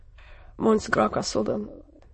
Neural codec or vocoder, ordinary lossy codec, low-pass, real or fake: autoencoder, 22.05 kHz, a latent of 192 numbers a frame, VITS, trained on many speakers; MP3, 32 kbps; 9.9 kHz; fake